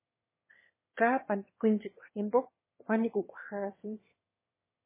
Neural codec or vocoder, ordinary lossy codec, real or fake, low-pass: autoencoder, 22.05 kHz, a latent of 192 numbers a frame, VITS, trained on one speaker; MP3, 16 kbps; fake; 3.6 kHz